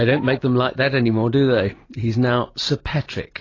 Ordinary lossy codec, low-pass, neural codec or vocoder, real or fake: AAC, 32 kbps; 7.2 kHz; none; real